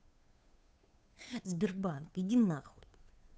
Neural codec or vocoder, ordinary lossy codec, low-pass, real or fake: codec, 16 kHz, 2 kbps, FunCodec, trained on Chinese and English, 25 frames a second; none; none; fake